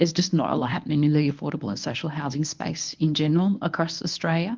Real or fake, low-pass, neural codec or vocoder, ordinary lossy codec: fake; 7.2 kHz; codec, 24 kHz, 0.9 kbps, WavTokenizer, medium speech release version 1; Opus, 24 kbps